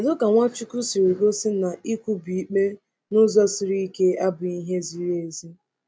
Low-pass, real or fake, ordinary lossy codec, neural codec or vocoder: none; real; none; none